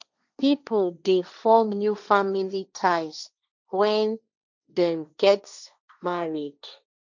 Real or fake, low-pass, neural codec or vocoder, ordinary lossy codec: fake; 7.2 kHz; codec, 16 kHz, 1.1 kbps, Voila-Tokenizer; none